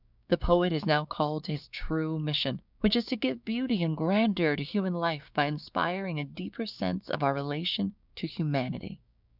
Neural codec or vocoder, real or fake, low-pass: codec, 16 kHz, 6 kbps, DAC; fake; 5.4 kHz